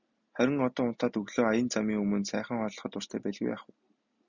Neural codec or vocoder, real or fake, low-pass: none; real; 7.2 kHz